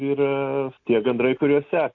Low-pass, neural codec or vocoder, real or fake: 7.2 kHz; none; real